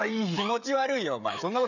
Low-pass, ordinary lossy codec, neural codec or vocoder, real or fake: 7.2 kHz; none; codec, 16 kHz, 16 kbps, FreqCodec, smaller model; fake